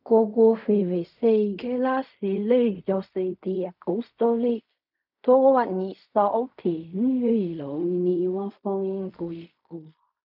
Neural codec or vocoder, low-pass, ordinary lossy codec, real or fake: codec, 16 kHz in and 24 kHz out, 0.4 kbps, LongCat-Audio-Codec, fine tuned four codebook decoder; 5.4 kHz; none; fake